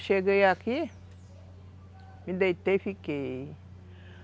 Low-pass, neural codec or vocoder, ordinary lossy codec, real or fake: none; none; none; real